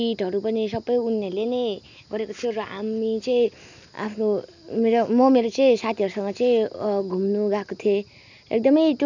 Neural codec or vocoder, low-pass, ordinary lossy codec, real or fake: none; 7.2 kHz; none; real